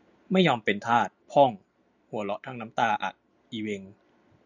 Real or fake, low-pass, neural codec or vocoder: real; 7.2 kHz; none